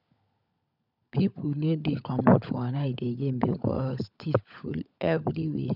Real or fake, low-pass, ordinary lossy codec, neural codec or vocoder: fake; 5.4 kHz; none; codec, 16 kHz, 16 kbps, FunCodec, trained on LibriTTS, 50 frames a second